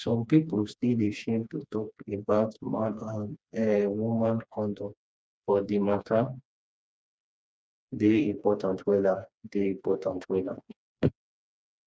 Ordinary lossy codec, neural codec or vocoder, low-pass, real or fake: none; codec, 16 kHz, 2 kbps, FreqCodec, smaller model; none; fake